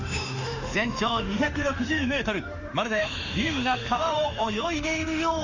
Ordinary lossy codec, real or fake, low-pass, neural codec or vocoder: Opus, 64 kbps; fake; 7.2 kHz; autoencoder, 48 kHz, 32 numbers a frame, DAC-VAE, trained on Japanese speech